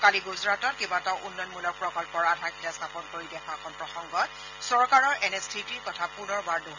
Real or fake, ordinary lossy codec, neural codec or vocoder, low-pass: real; none; none; 7.2 kHz